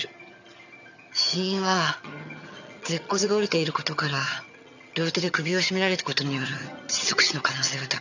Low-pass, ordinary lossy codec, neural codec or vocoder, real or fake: 7.2 kHz; none; vocoder, 22.05 kHz, 80 mel bands, HiFi-GAN; fake